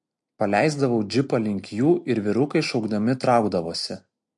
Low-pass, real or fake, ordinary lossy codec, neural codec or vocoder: 10.8 kHz; real; MP3, 48 kbps; none